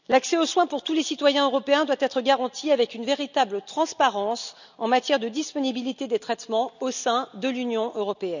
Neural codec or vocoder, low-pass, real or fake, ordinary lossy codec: none; 7.2 kHz; real; none